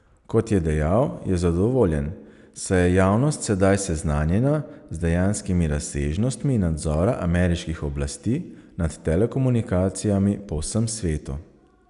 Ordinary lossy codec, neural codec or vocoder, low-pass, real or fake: none; none; 10.8 kHz; real